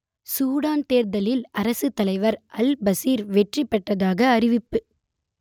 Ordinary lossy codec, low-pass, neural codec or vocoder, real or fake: none; 19.8 kHz; none; real